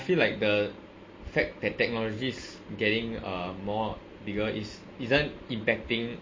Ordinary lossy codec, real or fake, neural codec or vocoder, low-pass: MP3, 32 kbps; real; none; 7.2 kHz